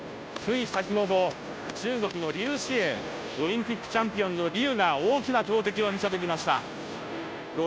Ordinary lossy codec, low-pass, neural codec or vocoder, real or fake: none; none; codec, 16 kHz, 0.5 kbps, FunCodec, trained on Chinese and English, 25 frames a second; fake